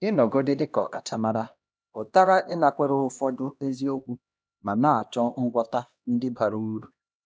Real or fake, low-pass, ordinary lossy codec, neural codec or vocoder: fake; none; none; codec, 16 kHz, 1 kbps, X-Codec, HuBERT features, trained on LibriSpeech